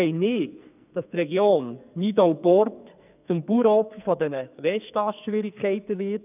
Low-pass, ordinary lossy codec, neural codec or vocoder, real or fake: 3.6 kHz; none; codec, 44.1 kHz, 2.6 kbps, SNAC; fake